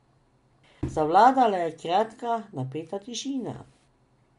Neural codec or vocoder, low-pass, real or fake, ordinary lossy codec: none; 10.8 kHz; real; MP3, 64 kbps